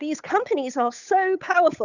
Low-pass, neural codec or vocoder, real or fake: 7.2 kHz; codec, 16 kHz, 8 kbps, FunCodec, trained on Chinese and English, 25 frames a second; fake